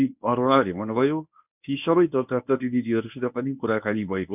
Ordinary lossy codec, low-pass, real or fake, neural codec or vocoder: none; 3.6 kHz; fake; codec, 24 kHz, 0.9 kbps, WavTokenizer, medium speech release version 1